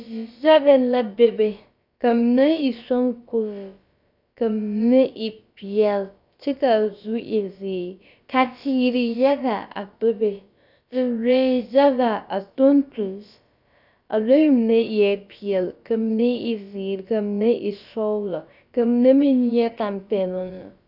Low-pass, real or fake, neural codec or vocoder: 5.4 kHz; fake; codec, 16 kHz, about 1 kbps, DyCAST, with the encoder's durations